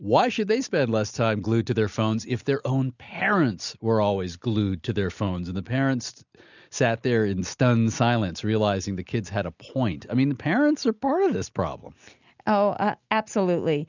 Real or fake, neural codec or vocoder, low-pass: real; none; 7.2 kHz